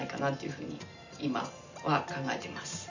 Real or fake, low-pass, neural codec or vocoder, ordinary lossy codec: real; 7.2 kHz; none; none